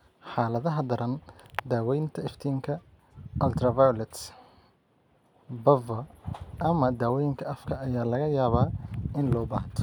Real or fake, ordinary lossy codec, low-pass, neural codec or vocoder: real; none; 19.8 kHz; none